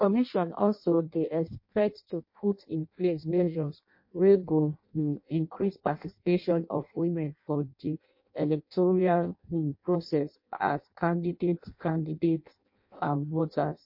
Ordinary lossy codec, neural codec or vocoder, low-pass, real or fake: MP3, 32 kbps; codec, 16 kHz in and 24 kHz out, 0.6 kbps, FireRedTTS-2 codec; 5.4 kHz; fake